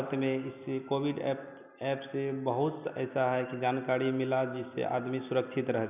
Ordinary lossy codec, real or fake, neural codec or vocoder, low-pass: none; real; none; 3.6 kHz